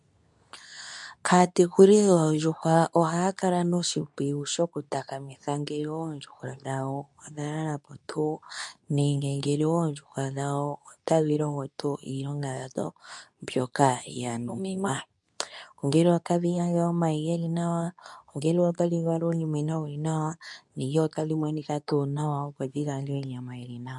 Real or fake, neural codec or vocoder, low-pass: fake; codec, 24 kHz, 0.9 kbps, WavTokenizer, medium speech release version 2; 10.8 kHz